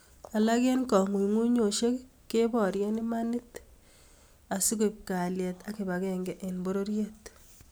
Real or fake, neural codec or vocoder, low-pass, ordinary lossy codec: real; none; none; none